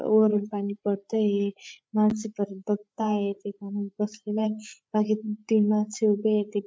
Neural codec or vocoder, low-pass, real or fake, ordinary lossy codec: codec, 16 kHz, 8 kbps, FreqCodec, larger model; none; fake; none